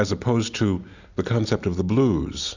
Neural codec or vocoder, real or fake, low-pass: none; real; 7.2 kHz